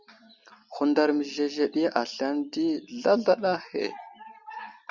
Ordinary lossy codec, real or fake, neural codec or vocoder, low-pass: Opus, 64 kbps; real; none; 7.2 kHz